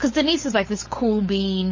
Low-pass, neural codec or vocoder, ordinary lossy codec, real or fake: 7.2 kHz; codec, 16 kHz, 4.8 kbps, FACodec; MP3, 32 kbps; fake